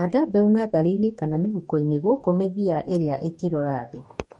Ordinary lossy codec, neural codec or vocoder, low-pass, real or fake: MP3, 48 kbps; codec, 44.1 kHz, 2.6 kbps, DAC; 19.8 kHz; fake